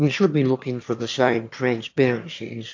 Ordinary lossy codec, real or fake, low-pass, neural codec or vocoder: AAC, 48 kbps; fake; 7.2 kHz; autoencoder, 22.05 kHz, a latent of 192 numbers a frame, VITS, trained on one speaker